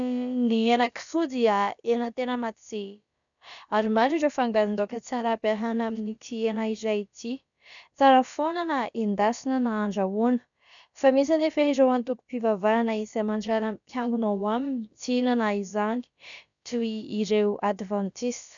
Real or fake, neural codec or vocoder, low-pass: fake; codec, 16 kHz, about 1 kbps, DyCAST, with the encoder's durations; 7.2 kHz